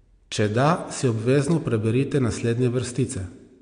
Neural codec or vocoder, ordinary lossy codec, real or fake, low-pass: none; MP3, 64 kbps; real; 9.9 kHz